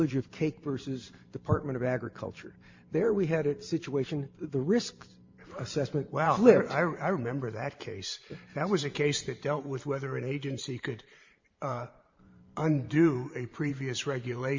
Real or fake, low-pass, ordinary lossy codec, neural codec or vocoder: fake; 7.2 kHz; MP3, 48 kbps; vocoder, 44.1 kHz, 128 mel bands every 512 samples, BigVGAN v2